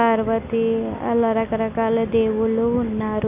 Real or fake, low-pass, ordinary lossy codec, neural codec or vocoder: real; 3.6 kHz; none; none